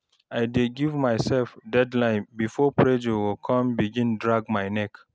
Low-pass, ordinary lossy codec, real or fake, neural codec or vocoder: none; none; real; none